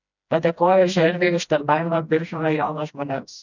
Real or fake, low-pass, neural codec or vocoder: fake; 7.2 kHz; codec, 16 kHz, 1 kbps, FreqCodec, smaller model